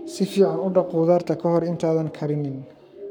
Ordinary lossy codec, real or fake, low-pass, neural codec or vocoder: none; fake; 19.8 kHz; codec, 44.1 kHz, 7.8 kbps, Pupu-Codec